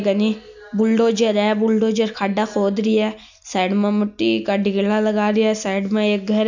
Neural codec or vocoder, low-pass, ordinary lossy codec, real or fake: none; 7.2 kHz; none; real